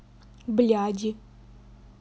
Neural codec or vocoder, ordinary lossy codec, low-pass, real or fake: none; none; none; real